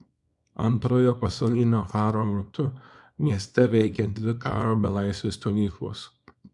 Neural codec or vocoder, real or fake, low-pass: codec, 24 kHz, 0.9 kbps, WavTokenizer, small release; fake; 10.8 kHz